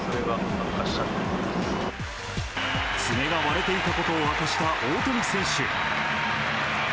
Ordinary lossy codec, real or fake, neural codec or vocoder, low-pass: none; real; none; none